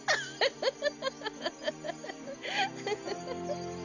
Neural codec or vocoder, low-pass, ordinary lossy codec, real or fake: none; 7.2 kHz; none; real